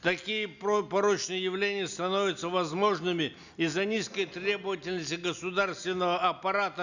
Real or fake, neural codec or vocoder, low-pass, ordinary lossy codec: real; none; 7.2 kHz; none